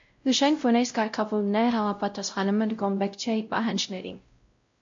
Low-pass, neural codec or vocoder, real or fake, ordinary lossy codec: 7.2 kHz; codec, 16 kHz, 0.5 kbps, X-Codec, WavLM features, trained on Multilingual LibriSpeech; fake; MP3, 48 kbps